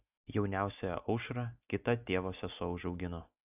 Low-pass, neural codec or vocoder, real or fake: 3.6 kHz; none; real